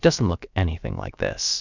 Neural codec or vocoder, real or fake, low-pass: codec, 16 kHz, about 1 kbps, DyCAST, with the encoder's durations; fake; 7.2 kHz